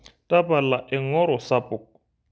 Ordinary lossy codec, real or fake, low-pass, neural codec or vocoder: none; real; none; none